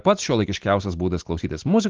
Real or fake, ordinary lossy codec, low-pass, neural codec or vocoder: real; Opus, 16 kbps; 7.2 kHz; none